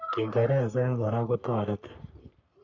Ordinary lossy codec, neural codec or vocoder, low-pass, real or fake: none; codec, 44.1 kHz, 3.4 kbps, Pupu-Codec; 7.2 kHz; fake